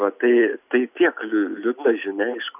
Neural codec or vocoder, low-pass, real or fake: none; 3.6 kHz; real